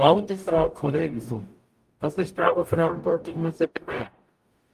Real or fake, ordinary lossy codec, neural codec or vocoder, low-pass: fake; Opus, 32 kbps; codec, 44.1 kHz, 0.9 kbps, DAC; 14.4 kHz